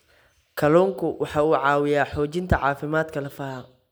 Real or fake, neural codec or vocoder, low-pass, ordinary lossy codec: real; none; none; none